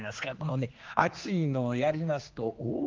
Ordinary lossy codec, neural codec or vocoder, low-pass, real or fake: Opus, 32 kbps; codec, 16 kHz, 2 kbps, X-Codec, HuBERT features, trained on general audio; 7.2 kHz; fake